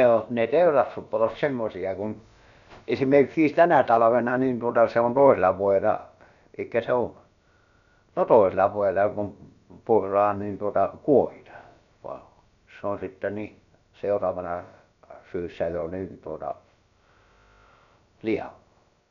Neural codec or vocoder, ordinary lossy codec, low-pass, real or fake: codec, 16 kHz, about 1 kbps, DyCAST, with the encoder's durations; none; 7.2 kHz; fake